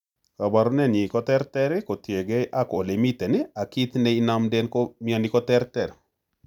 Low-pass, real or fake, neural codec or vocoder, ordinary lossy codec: 19.8 kHz; real; none; none